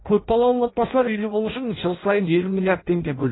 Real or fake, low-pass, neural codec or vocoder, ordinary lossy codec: fake; 7.2 kHz; codec, 16 kHz in and 24 kHz out, 0.6 kbps, FireRedTTS-2 codec; AAC, 16 kbps